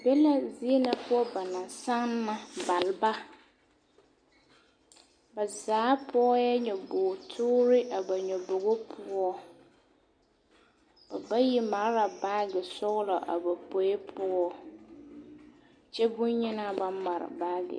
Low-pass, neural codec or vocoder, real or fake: 9.9 kHz; none; real